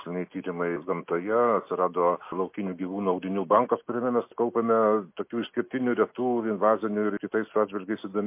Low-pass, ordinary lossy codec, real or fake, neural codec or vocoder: 3.6 kHz; MP3, 24 kbps; real; none